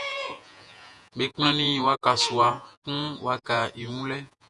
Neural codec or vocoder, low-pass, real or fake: vocoder, 48 kHz, 128 mel bands, Vocos; 10.8 kHz; fake